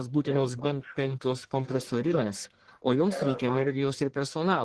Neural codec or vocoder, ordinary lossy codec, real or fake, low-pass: codec, 44.1 kHz, 1.7 kbps, Pupu-Codec; Opus, 16 kbps; fake; 10.8 kHz